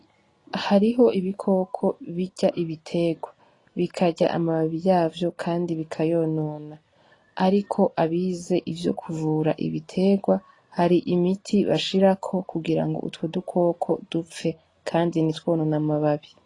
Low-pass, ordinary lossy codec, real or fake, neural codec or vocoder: 10.8 kHz; AAC, 32 kbps; real; none